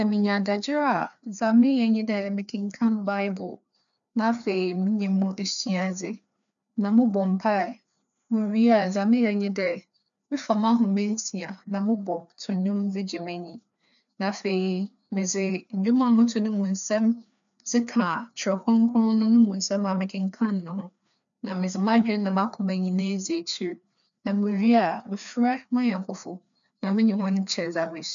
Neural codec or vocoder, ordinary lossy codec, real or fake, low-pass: codec, 16 kHz, 2 kbps, FreqCodec, larger model; none; fake; 7.2 kHz